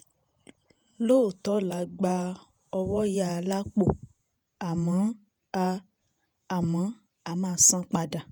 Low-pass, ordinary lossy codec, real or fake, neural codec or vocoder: none; none; fake; vocoder, 48 kHz, 128 mel bands, Vocos